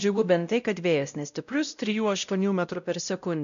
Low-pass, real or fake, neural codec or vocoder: 7.2 kHz; fake; codec, 16 kHz, 0.5 kbps, X-Codec, WavLM features, trained on Multilingual LibriSpeech